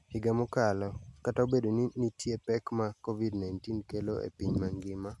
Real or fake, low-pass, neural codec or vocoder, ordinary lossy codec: real; none; none; none